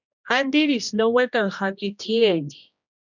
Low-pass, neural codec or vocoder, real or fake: 7.2 kHz; codec, 16 kHz, 1 kbps, X-Codec, HuBERT features, trained on general audio; fake